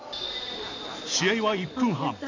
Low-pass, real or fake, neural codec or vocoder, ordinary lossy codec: 7.2 kHz; real; none; AAC, 48 kbps